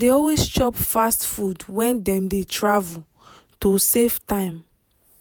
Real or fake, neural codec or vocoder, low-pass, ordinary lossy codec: fake; vocoder, 48 kHz, 128 mel bands, Vocos; none; none